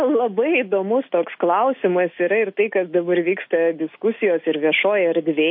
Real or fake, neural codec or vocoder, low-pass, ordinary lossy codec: real; none; 5.4 kHz; MP3, 32 kbps